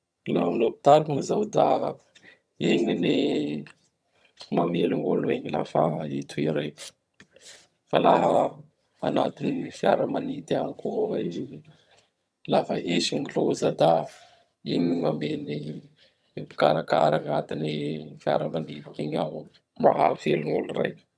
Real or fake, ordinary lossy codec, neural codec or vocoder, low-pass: fake; none; vocoder, 22.05 kHz, 80 mel bands, HiFi-GAN; none